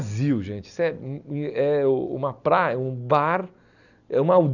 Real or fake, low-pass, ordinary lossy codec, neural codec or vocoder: real; 7.2 kHz; none; none